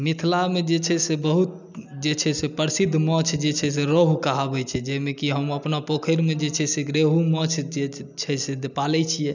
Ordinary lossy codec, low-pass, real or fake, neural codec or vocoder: none; 7.2 kHz; real; none